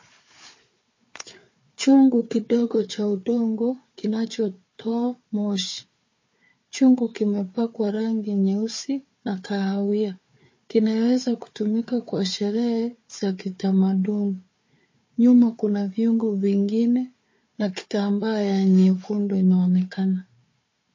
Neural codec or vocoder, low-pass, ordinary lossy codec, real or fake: codec, 16 kHz, 4 kbps, FunCodec, trained on Chinese and English, 50 frames a second; 7.2 kHz; MP3, 32 kbps; fake